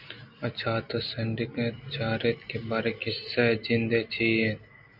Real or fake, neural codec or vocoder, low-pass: real; none; 5.4 kHz